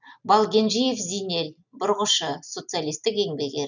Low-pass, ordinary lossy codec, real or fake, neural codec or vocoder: 7.2 kHz; none; real; none